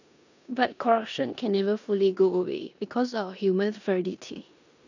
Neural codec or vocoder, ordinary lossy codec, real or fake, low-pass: codec, 16 kHz in and 24 kHz out, 0.9 kbps, LongCat-Audio-Codec, four codebook decoder; none; fake; 7.2 kHz